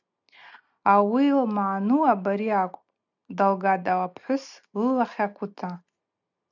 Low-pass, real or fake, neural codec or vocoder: 7.2 kHz; real; none